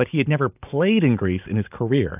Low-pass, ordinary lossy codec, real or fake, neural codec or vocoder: 3.6 kHz; AAC, 32 kbps; real; none